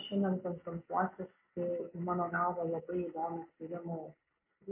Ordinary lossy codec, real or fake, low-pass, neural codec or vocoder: MP3, 24 kbps; real; 3.6 kHz; none